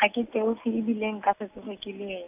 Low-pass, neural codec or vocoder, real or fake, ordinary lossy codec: 3.6 kHz; none; real; none